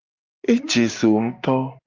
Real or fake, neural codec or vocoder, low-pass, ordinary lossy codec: real; none; 7.2 kHz; Opus, 24 kbps